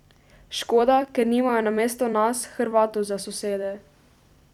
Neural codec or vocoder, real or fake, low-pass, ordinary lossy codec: vocoder, 44.1 kHz, 128 mel bands every 256 samples, BigVGAN v2; fake; 19.8 kHz; none